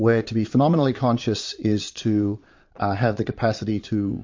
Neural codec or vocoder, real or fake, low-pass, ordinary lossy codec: vocoder, 22.05 kHz, 80 mel bands, Vocos; fake; 7.2 kHz; MP3, 48 kbps